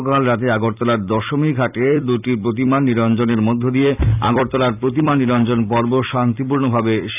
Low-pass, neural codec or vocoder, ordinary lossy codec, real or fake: 3.6 kHz; vocoder, 44.1 kHz, 128 mel bands every 256 samples, BigVGAN v2; none; fake